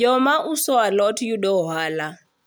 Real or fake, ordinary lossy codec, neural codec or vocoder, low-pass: real; none; none; none